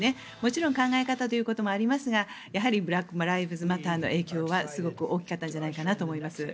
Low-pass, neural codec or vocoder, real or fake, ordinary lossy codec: none; none; real; none